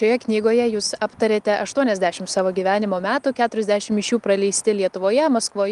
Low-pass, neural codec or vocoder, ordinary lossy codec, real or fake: 10.8 kHz; none; Opus, 32 kbps; real